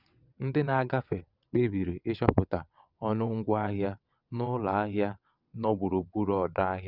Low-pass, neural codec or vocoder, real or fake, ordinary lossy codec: 5.4 kHz; vocoder, 22.05 kHz, 80 mel bands, WaveNeXt; fake; none